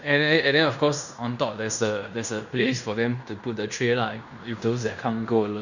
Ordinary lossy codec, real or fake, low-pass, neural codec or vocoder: none; fake; 7.2 kHz; codec, 16 kHz in and 24 kHz out, 0.9 kbps, LongCat-Audio-Codec, fine tuned four codebook decoder